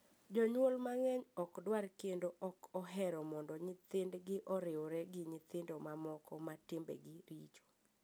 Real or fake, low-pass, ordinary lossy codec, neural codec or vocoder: real; none; none; none